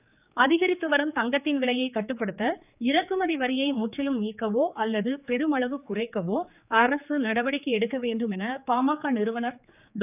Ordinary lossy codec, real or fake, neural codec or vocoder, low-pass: none; fake; codec, 16 kHz, 4 kbps, X-Codec, HuBERT features, trained on general audio; 3.6 kHz